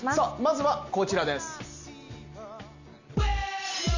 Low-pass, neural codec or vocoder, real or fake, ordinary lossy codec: 7.2 kHz; none; real; none